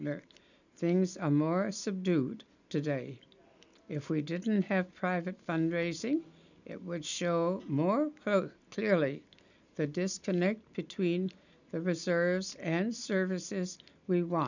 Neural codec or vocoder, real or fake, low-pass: none; real; 7.2 kHz